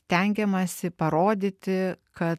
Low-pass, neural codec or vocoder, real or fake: 14.4 kHz; none; real